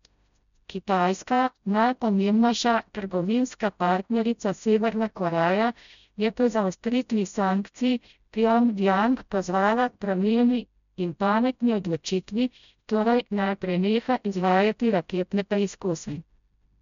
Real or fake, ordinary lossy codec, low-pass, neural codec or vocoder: fake; none; 7.2 kHz; codec, 16 kHz, 0.5 kbps, FreqCodec, smaller model